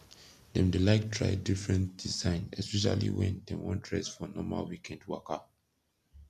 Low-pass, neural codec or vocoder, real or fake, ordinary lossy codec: 14.4 kHz; none; real; none